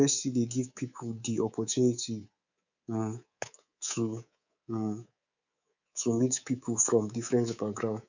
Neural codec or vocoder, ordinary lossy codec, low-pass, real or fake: codec, 24 kHz, 3.1 kbps, DualCodec; none; 7.2 kHz; fake